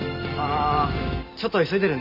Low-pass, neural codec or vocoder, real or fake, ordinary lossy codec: 5.4 kHz; none; real; none